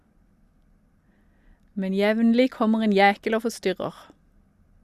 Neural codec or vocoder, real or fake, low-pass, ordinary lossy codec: none; real; 14.4 kHz; Opus, 64 kbps